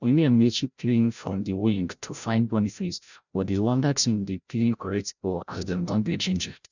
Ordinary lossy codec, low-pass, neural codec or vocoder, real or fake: none; 7.2 kHz; codec, 16 kHz, 0.5 kbps, FreqCodec, larger model; fake